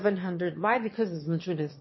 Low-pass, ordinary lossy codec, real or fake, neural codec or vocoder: 7.2 kHz; MP3, 24 kbps; fake; autoencoder, 22.05 kHz, a latent of 192 numbers a frame, VITS, trained on one speaker